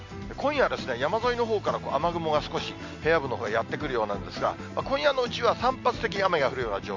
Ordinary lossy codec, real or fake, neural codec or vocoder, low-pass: MP3, 48 kbps; real; none; 7.2 kHz